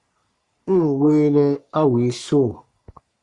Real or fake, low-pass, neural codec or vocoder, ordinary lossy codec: fake; 10.8 kHz; codec, 44.1 kHz, 3.4 kbps, Pupu-Codec; Opus, 64 kbps